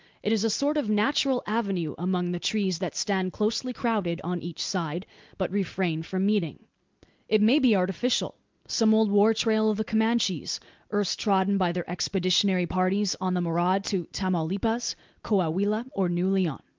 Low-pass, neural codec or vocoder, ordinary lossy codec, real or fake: 7.2 kHz; none; Opus, 32 kbps; real